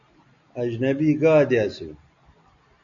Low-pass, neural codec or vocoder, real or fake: 7.2 kHz; none; real